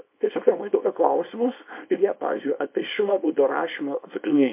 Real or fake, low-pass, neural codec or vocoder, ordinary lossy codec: fake; 3.6 kHz; codec, 24 kHz, 0.9 kbps, WavTokenizer, small release; MP3, 24 kbps